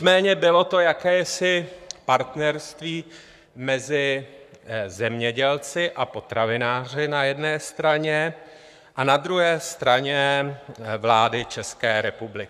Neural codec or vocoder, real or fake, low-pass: codec, 44.1 kHz, 7.8 kbps, Pupu-Codec; fake; 14.4 kHz